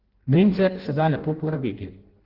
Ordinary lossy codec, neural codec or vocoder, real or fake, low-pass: Opus, 16 kbps; codec, 16 kHz in and 24 kHz out, 0.6 kbps, FireRedTTS-2 codec; fake; 5.4 kHz